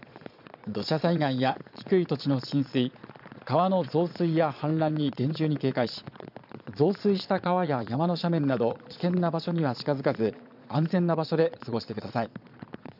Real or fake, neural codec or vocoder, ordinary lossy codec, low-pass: fake; vocoder, 22.05 kHz, 80 mel bands, Vocos; none; 5.4 kHz